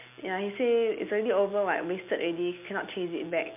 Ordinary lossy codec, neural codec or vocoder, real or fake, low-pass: MP3, 32 kbps; none; real; 3.6 kHz